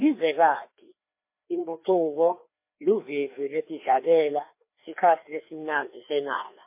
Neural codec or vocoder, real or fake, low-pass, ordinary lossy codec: codec, 16 kHz, 2 kbps, FreqCodec, larger model; fake; 3.6 kHz; MP3, 24 kbps